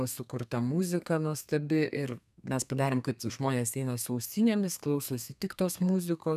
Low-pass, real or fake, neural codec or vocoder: 14.4 kHz; fake; codec, 32 kHz, 1.9 kbps, SNAC